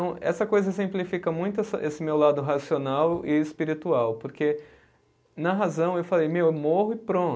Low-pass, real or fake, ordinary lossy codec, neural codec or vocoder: none; real; none; none